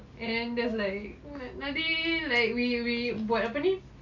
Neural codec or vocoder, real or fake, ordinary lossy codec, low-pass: none; real; none; 7.2 kHz